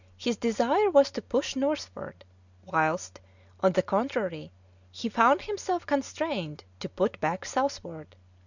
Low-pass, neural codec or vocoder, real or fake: 7.2 kHz; none; real